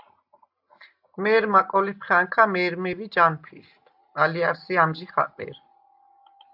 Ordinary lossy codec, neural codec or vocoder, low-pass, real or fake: AAC, 48 kbps; none; 5.4 kHz; real